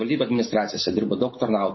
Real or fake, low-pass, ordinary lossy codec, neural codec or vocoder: real; 7.2 kHz; MP3, 24 kbps; none